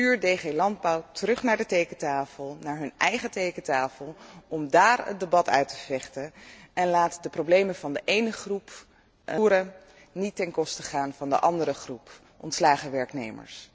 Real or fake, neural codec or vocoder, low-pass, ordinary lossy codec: real; none; none; none